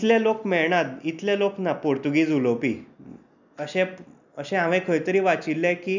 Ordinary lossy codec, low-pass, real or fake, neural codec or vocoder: none; 7.2 kHz; real; none